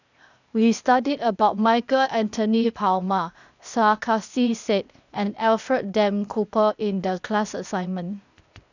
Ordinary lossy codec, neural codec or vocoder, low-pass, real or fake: none; codec, 16 kHz, 0.8 kbps, ZipCodec; 7.2 kHz; fake